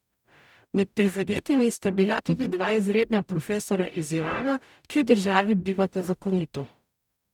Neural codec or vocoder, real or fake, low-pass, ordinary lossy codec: codec, 44.1 kHz, 0.9 kbps, DAC; fake; 19.8 kHz; none